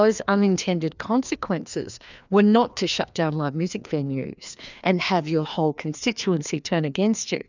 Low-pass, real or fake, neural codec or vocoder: 7.2 kHz; fake; codec, 16 kHz, 2 kbps, FreqCodec, larger model